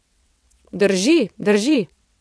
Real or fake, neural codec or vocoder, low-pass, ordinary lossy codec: fake; vocoder, 22.05 kHz, 80 mel bands, WaveNeXt; none; none